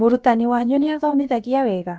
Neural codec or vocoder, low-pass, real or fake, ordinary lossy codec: codec, 16 kHz, about 1 kbps, DyCAST, with the encoder's durations; none; fake; none